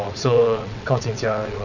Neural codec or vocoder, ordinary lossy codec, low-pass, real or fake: vocoder, 22.05 kHz, 80 mel bands, WaveNeXt; none; 7.2 kHz; fake